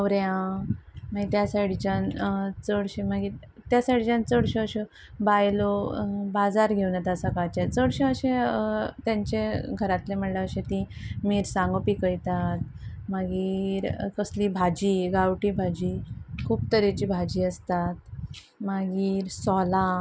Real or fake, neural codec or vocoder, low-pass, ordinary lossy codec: real; none; none; none